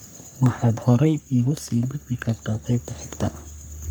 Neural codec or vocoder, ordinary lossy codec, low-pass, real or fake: codec, 44.1 kHz, 3.4 kbps, Pupu-Codec; none; none; fake